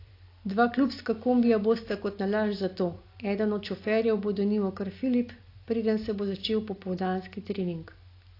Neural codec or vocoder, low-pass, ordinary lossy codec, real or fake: none; 5.4 kHz; AAC, 32 kbps; real